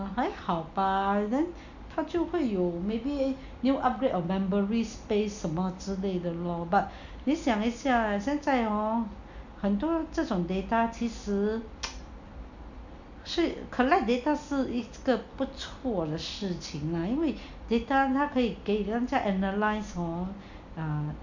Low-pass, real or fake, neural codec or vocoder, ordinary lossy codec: 7.2 kHz; real; none; none